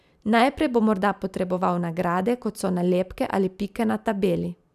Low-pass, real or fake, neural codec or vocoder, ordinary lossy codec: 14.4 kHz; real; none; none